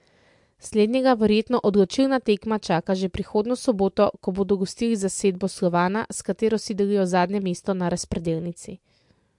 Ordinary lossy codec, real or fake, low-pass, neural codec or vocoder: MP3, 64 kbps; real; 10.8 kHz; none